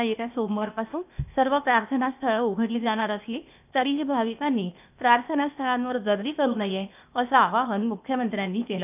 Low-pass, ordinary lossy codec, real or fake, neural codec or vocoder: 3.6 kHz; none; fake; codec, 16 kHz, 0.8 kbps, ZipCodec